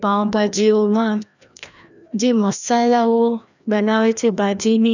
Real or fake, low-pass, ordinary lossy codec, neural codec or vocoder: fake; 7.2 kHz; none; codec, 16 kHz, 1 kbps, FreqCodec, larger model